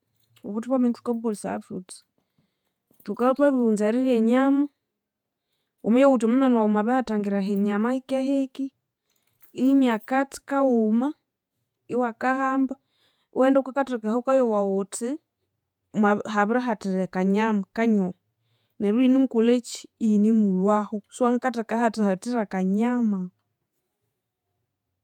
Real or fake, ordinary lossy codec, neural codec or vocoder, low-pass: fake; none; vocoder, 48 kHz, 128 mel bands, Vocos; 19.8 kHz